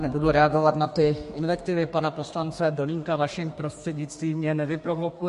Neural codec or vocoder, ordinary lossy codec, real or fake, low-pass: codec, 32 kHz, 1.9 kbps, SNAC; MP3, 48 kbps; fake; 14.4 kHz